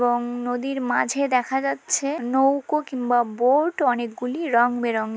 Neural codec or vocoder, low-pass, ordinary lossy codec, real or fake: none; none; none; real